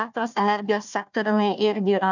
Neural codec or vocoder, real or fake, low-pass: codec, 16 kHz, 1 kbps, FunCodec, trained on Chinese and English, 50 frames a second; fake; 7.2 kHz